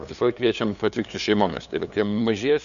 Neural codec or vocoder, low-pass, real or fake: codec, 16 kHz, 2 kbps, FunCodec, trained on LibriTTS, 25 frames a second; 7.2 kHz; fake